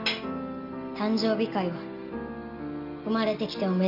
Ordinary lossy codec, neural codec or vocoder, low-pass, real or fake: none; none; 5.4 kHz; real